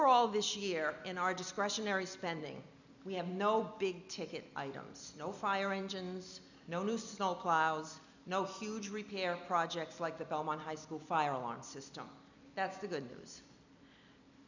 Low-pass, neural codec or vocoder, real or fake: 7.2 kHz; none; real